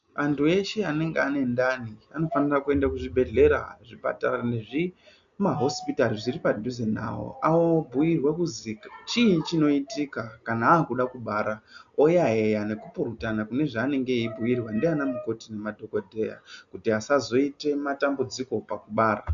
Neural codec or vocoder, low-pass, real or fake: none; 7.2 kHz; real